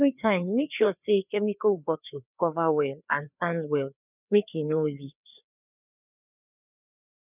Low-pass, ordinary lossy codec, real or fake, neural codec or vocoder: 3.6 kHz; none; fake; codec, 16 kHz, 2 kbps, FreqCodec, larger model